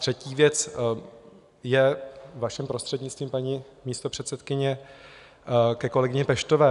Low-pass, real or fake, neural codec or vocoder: 10.8 kHz; real; none